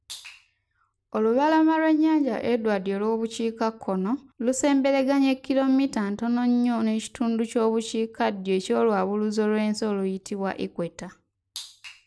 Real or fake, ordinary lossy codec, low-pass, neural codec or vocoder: real; none; none; none